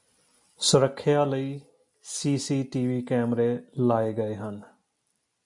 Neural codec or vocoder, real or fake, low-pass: none; real; 10.8 kHz